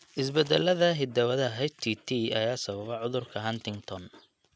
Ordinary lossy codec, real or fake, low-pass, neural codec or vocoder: none; real; none; none